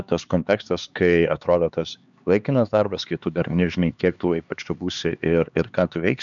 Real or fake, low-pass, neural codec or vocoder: fake; 7.2 kHz; codec, 16 kHz, 4 kbps, X-Codec, HuBERT features, trained on LibriSpeech